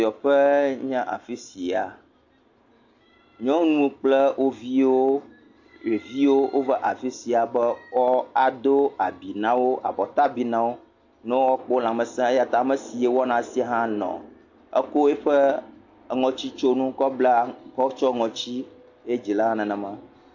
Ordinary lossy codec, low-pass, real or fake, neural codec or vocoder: AAC, 48 kbps; 7.2 kHz; real; none